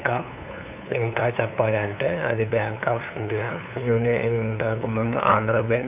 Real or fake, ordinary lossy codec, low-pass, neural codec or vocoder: fake; none; 3.6 kHz; codec, 16 kHz, 2 kbps, FunCodec, trained on LibriTTS, 25 frames a second